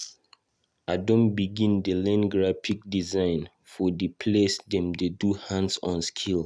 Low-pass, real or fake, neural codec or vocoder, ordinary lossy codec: none; real; none; none